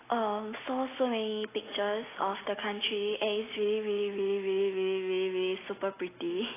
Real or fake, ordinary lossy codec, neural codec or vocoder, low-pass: real; AAC, 16 kbps; none; 3.6 kHz